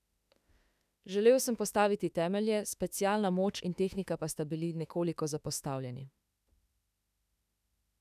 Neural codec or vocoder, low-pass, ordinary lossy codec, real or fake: autoencoder, 48 kHz, 32 numbers a frame, DAC-VAE, trained on Japanese speech; 14.4 kHz; none; fake